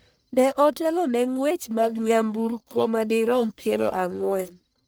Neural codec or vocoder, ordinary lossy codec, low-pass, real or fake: codec, 44.1 kHz, 1.7 kbps, Pupu-Codec; none; none; fake